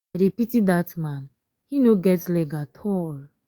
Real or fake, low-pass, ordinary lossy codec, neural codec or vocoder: fake; 19.8 kHz; Opus, 64 kbps; codec, 44.1 kHz, 7.8 kbps, DAC